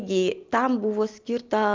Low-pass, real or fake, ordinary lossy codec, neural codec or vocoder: 7.2 kHz; real; Opus, 32 kbps; none